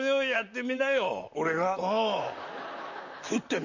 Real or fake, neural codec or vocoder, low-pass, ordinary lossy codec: fake; vocoder, 44.1 kHz, 128 mel bands, Pupu-Vocoder; 7.2 kHz; none